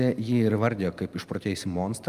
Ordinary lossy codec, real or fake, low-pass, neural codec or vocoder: Opus, 32 kbps; real; 14.4 kHz; none